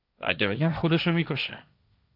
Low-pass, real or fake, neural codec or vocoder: 5.4 kHz; fake; codec, 16 kHz, 1.1 kbps, Voila-Tokenizer